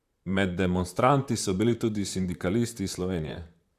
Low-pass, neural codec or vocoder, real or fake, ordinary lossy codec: 14.4 kHz; vocoder, 44.1 kHz, 128 mel bands, Pupu-Vocoder; fake; Opus, 64 kbps